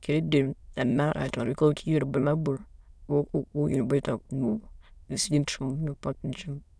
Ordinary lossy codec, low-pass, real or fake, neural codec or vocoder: none; none; fake; autoencoder, 22.05 kHz, a latent of 192 numbers a frame, VITS, trained on many speakers